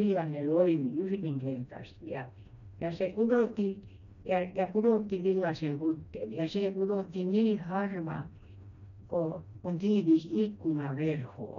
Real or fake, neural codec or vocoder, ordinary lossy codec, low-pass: fake; codec, 16 kHz, 1 kbps, FreqCodec, smaller model; none; 7.2 kHz